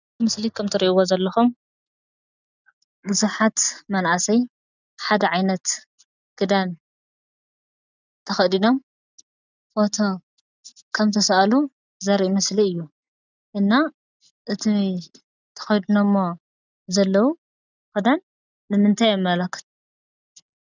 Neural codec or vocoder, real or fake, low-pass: none; real; 7.2 kHz